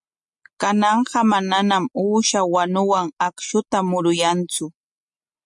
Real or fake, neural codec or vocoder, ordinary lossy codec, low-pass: real; none; MP3, 64 kbps; 10.8 kHz